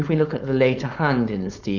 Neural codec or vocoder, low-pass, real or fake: codec, 16 kHz, 4.8 kbps, FACodec; 7.2 kHz; fake